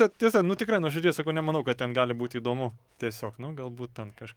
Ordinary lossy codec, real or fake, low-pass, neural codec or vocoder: Opus, 32 kbps; fake; 19.8 kHz; codec, 44.1 kHz, 7.8 kbps, DAC